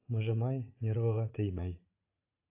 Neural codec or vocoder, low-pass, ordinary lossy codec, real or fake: vocoder, 44.1 kHz, 80 mel bands, Vocos; 3.6 kHz; AAC, 32 kbps; fake